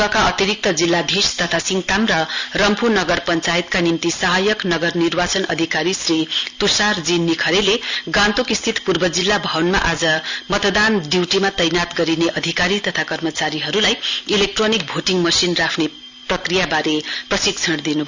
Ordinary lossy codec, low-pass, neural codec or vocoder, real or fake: Opus, 64 kbps; 7.2 kHz; none; real